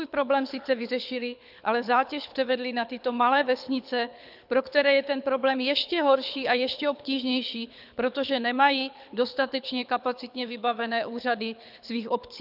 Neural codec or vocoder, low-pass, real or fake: codec, 24 kHz, 6 kbps, HILCodec; 5.4 kHz; fake